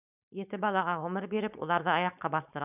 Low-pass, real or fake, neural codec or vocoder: 3.6 kHz; fake; codec, 16 kHz, 4.8 kbps, FACodec